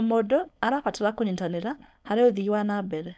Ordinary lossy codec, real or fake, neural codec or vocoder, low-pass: none; fake; codec, 16 kHz, 4.8 kbps, FACodec; none